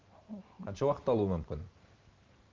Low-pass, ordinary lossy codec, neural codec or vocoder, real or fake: 7.2 kHz; Opus, 24 kbps; vocoder, 44.1 kHz, 128 mel bands every 512 samples, BigVGAN v2; fake